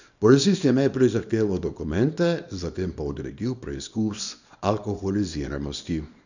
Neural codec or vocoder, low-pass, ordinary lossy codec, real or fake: codec, 24 kHz, 0.9 kbps, WavTokenizer, small release; 7.2 kHz; none; fake